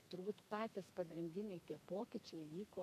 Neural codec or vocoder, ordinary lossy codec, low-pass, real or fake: codec, 32 kHz, 1.9 kbps, SNAC; AAC, 96 kbps; 14.4 kHz; fake